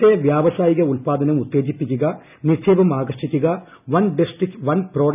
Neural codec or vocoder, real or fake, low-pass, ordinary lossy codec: none; real; 3.6 kHz; none